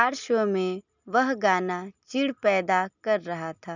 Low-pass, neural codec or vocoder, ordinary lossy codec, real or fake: 7.2 kHz; none; none; real